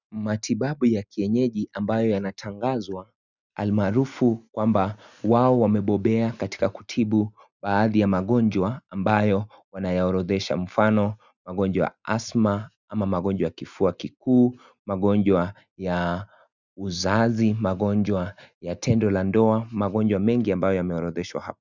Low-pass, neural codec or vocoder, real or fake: 7.2 kHz; none; real